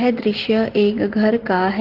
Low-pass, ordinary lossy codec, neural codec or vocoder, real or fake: 5.4 kHz; Opus, 16 kbps; none; real